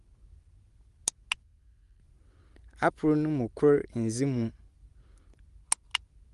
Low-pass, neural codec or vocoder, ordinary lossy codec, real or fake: 10.8 kHz; none; Opus, 24 kbps; real